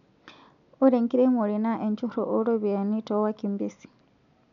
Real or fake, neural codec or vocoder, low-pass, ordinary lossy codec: real; none; 7.2 kHz; none